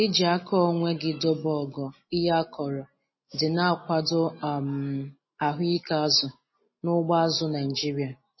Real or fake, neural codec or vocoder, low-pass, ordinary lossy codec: real; none; 7.2 kHz; MP3, 24 kbps